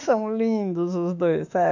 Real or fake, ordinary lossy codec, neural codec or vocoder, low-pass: fake; none; autoencoder, 48 kHz, 32 numbers a frame, DAC-VAE, trained on Japanese speech; 7.2 kHz